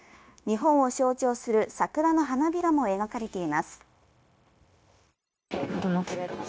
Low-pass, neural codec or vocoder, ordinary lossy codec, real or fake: none; codec, 16 kHz, 0.9 kbps, LongCat-Audio-Codec; none; fake